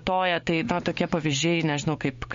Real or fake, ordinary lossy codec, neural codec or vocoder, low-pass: fake; MP3, 48 kbps; codec, 16 kHz, 6 kbps, DAC; 7.2 kHz